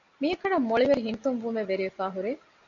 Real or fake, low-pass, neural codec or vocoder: real; 7.2 kHz; none